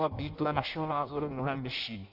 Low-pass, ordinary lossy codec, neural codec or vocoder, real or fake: 5.4 kHz; Opus, 64 kbps; codec, 16 kHz in and 24 kHz out, 0.6 kbps, FireRedTTS-2 codec; fake